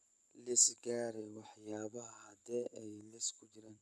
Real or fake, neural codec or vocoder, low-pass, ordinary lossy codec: fake; vocoder, 24 kHz, 100 mel bands, Vocos; none; none